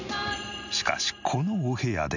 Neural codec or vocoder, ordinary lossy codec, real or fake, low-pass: none; none; real; 7.2 kHz